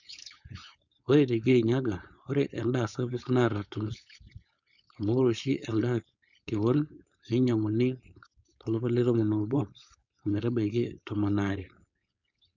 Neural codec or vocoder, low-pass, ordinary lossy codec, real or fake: codec, 16 kHz, 4.8 kbps, FACodec; 7.2 kHz; none; fake